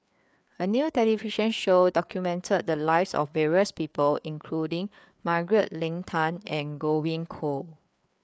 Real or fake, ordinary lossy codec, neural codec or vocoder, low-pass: fake; none; codec, 16 kHz, 4 kbps, FreqCodec, larger model; none